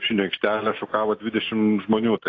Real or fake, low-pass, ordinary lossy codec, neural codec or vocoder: real; 7.2 kHz; AAC, 32 kbps; none